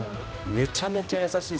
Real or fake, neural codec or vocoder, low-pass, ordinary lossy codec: fake; codec, 16 kHz, 1 kbps, X-Codec, HuBERT features, trained on general audio; none; none